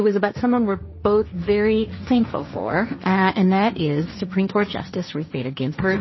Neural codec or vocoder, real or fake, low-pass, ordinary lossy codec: codec, 16 kHz, 1.1 kbps, Voila-Tokenizer; fake; 7.2 kHz; MP3, 24 kbps